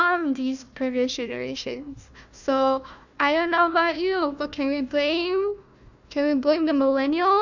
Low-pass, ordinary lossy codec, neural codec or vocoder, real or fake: 7.2 kHz; none; codec, 16 kHz, 1 kbps, FunCodec, trained on Chinese and English, 50 frames a second; fake